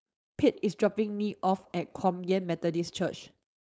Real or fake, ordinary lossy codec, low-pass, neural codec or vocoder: fake; none; none; codec, 16 kHz, 4.8 kbps, FACodec